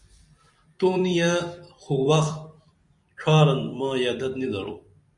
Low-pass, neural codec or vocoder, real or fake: 10.8 kHz; vocoder, 44.1 kHz, 128 mel bands every 256 samples, BigVGAN v2; fake